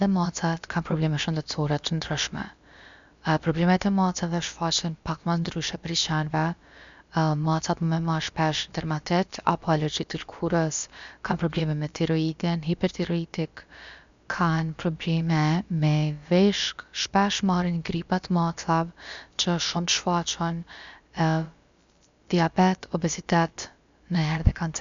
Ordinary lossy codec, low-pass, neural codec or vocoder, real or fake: MP3, 64 kbps; 7.2 kHz; codec, 16 kHz, about 1 kbps, DyCAST, with the encoder's durations; fake